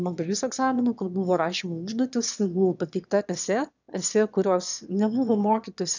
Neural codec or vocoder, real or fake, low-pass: autoencoder, 22.05 kHz, a latent of 192 numbers a frame, VITS, trained on one speaker; fake; 7.2 kHz